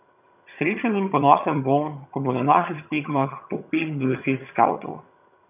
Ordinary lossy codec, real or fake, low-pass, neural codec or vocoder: none; fake; 3.6 kHz; vocoder, 22.05 kHz, 80 mel bands, HiFi-GAN